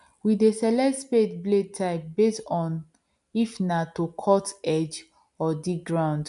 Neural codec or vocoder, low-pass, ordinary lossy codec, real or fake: none; 10.8 kHz; none; real